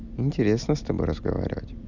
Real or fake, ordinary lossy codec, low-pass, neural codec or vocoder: real; Opus, 64 kbps; 7.2 kHz; none